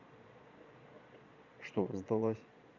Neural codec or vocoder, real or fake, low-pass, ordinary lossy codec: vocoder, 22.05 kHz, 80 mel bands, Vocos; fake; 7.2 kHz; none